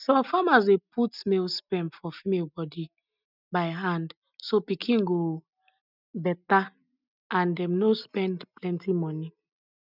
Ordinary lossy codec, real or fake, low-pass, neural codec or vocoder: none; real; 5.4 kHz; none